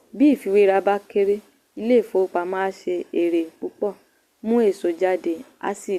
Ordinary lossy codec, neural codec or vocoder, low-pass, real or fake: Opus, 64 kbps; none; 14.4 kHz; real